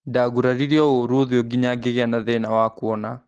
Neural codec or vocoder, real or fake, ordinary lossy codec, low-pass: none; real; Opus, 16 kbps; 10.8 kHz